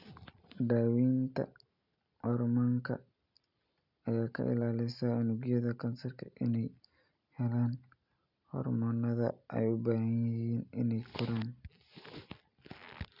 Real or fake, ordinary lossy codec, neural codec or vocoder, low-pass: real; none; none; 5.4 kHz